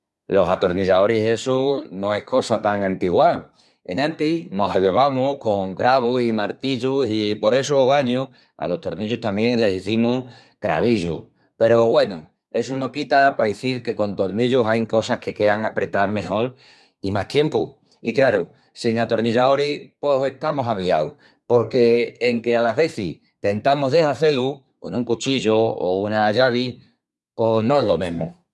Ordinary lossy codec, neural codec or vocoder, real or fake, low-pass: none; codec, 24 kHz, 1 kbps, SNAC; fake; none